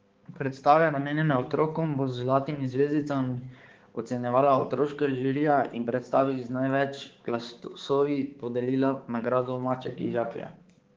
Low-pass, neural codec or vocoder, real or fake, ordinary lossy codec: 7.2 kHz; codec, 16 kHz, 4 kbps, X-Codec, HuBERT features, trained on balanced general audio; fake; Opus, 16 kbps